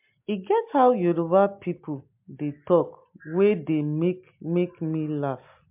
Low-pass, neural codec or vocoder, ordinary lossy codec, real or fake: 3.6 kHz; none; MP3, 32 kbps; real